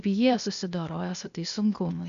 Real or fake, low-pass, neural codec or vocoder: fake; 7.2 kHz; codec, 16 kHz, 0.8 kbps, ZipCodec